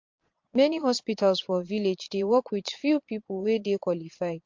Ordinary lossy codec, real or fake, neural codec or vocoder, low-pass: MP3, 48 kbps; fake; vocoder, 22.05 kHz, 80 mel bands, Vocos; 7.2 kHz